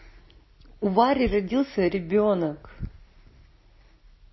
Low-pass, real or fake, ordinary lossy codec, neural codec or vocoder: 7.2 kHz; real; MP3, 24 kbps; none